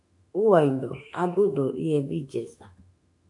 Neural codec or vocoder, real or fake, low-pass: autoencoder, 48 kHz, 32 numbers a frame, DAC-VAE, trained on Japanese speech; fake; 10.8 kHz